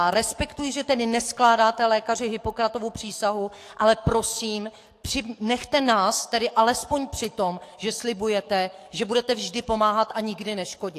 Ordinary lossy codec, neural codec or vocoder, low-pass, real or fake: AAC, 64 kbps; codec, 44.1 kHz, 7.8 kbps, DAC; 14.4 kHz; fake